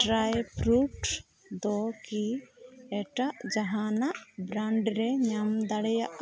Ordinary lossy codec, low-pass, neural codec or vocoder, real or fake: none; none; none; real